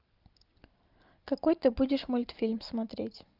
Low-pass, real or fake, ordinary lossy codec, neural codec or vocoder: 5.4 kHz; real; Opus, 32 kbps; none